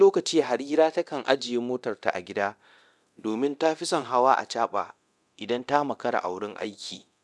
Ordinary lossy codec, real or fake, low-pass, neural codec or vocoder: none; fake; none; codec, 24 kHz, 0.9 kbps, DualCodec